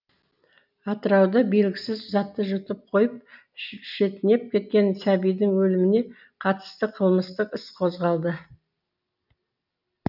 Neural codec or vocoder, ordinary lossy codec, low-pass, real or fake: none; none; 5.4 kHz; real